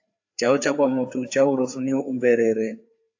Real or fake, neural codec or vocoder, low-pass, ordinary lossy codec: fake; codec, 16 kHz, 4 kbps, FreqCodec, larger model; 7.2 kHz; AAC, 48 kbps